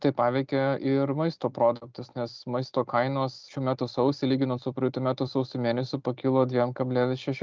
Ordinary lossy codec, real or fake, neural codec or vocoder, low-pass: Opus, 24 kbps; real; none; 7.2 kHz